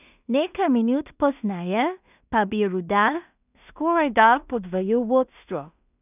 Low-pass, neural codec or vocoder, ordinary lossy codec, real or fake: 3.6 kHz; codec, 16 kHz in and 24 kHz out, 0.4 kbps, LongCat-Audio-Codec, two codebook decoder; none; fake